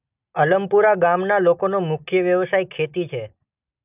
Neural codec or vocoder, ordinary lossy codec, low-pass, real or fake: none; none; 3.6 kHz; real